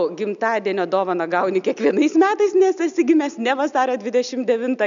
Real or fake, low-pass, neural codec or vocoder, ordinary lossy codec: real; 7.2 kHz; none; MP3, 96 kbps